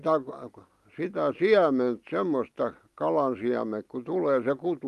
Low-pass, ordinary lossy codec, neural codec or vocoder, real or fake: 14.4 kHz; Opus, 32 kbps; none; real